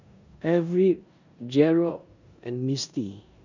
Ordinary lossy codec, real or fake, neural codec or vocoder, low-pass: none; fake; codec, 16 kHz in and 24 kHz out, 0.9 kbps, LongCat-Audio-Codec, four codebook decoder; 7.2 kHz